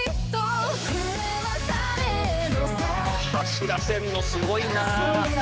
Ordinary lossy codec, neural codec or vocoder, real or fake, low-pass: none; codec, 16 kHz, 4 kbps, X-Codec, HuBERT features, trained on general audio; fake; none